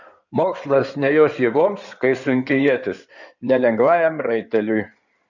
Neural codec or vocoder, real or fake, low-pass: codec, 16 kHz in and 24 kHz out, 2.2 kbps, FireRedTTS-2 codec; fake; 7.2 kHz